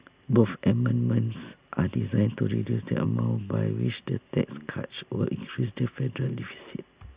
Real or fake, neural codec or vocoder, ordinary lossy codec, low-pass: real; none; Opus, 64 kbps; 3.6 kHz